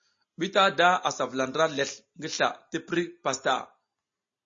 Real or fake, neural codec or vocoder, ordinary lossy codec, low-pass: real; none; MP3, 32 kbps; 7.2 kHz